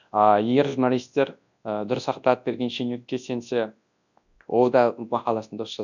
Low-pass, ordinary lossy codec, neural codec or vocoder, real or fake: 7.2 kHz; none; codec, 24 kHz, 0.9 kbps, WavTokenizer, large speech release; fake